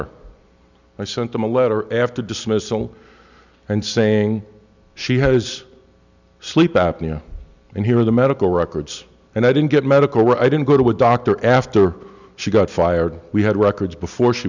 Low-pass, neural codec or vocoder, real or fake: 7.2 kHz; none; real